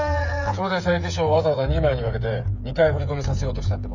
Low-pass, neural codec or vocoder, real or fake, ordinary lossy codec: 7.2 kHz; codec, 16 kHz, 8 kbps, FreqCodec, smaller model; fake; none